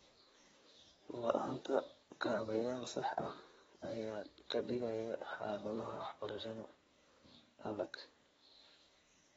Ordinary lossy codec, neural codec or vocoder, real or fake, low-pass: AAC, 24 kbps; codec, 24 kHz, 1 kbps, SNAC; fake; 10.8 kHz